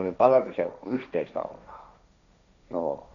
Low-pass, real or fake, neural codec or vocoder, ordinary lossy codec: 7.2 kHz; fake; codec, 16 kHz, 1.1 kbps, Voila-Tokenizer; none